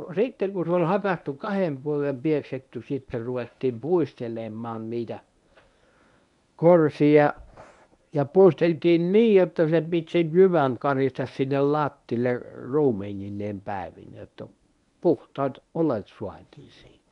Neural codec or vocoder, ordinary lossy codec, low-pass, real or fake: codec, 24 kHz, 0.9 kbps, WavTokenizer, medium speech release version 1; none; 10.8 kHz; fake